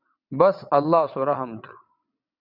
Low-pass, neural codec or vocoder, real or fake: 5.4 kHz; vocoder, 22.05 kHz, 80 mel bands, WaveNeXt; fake